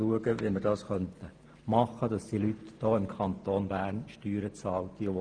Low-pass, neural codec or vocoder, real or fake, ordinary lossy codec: 9.9 kHz; none; real; Opus, 24 kbps